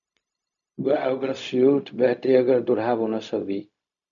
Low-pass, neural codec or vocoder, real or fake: 7.2 kHz; codec, 16 kHz, 0.4 kbps, LongCat-Audio-Codec; fake